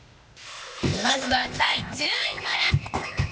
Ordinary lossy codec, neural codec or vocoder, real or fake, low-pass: none; codec, 16 kHz, 0.8 kbps, ZipCodec; fake; none